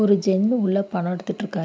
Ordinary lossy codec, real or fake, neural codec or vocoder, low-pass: none; real; none; none